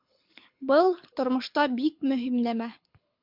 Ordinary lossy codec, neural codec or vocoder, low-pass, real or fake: MP3, 48 kbps; codec, 24 kHz, 6 kbps, HILCodec; 5.4 kHz; fake